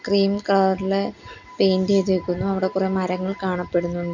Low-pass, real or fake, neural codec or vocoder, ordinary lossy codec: 7.2 kHz; real; none; none